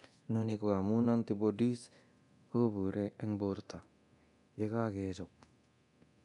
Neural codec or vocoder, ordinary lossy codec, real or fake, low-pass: codec, 24 kHz, 0.9 kbps, DualCodec; none; fake; 10.8 kHz